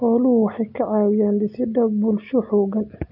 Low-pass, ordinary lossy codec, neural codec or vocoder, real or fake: 5.4 kHz; none; none; real